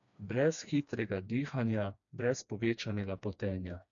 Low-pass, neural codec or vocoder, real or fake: 7.2 kHz; codec, 16 kHz, 2 kbps, FreqCodec, smaller model; fake